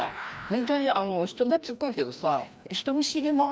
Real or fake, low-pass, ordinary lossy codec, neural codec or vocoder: fake; none; none; codec, 16 kHz, 1 kbps, FreqCodec, larger model